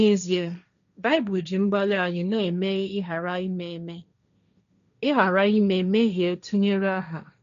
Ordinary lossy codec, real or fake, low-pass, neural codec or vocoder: none; fake; 7.2 kHz; codec, 16 kHz, 1.1 kbps, Voila-Tokenizer